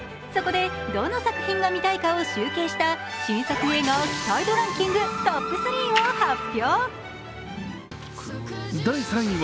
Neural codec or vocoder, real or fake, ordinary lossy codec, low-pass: none; real; none; none